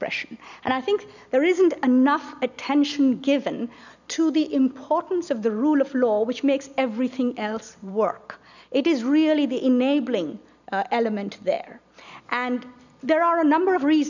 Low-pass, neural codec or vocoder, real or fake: 7.2 kHz; none; real